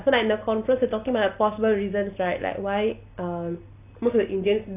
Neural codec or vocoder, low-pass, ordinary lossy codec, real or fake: none; 3.6 kHz; none; real